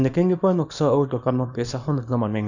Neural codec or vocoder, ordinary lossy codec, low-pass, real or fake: codec, 24 kHz, 0.9 kbps, WavTokenizer, small release; none; 7.2 kHz; fake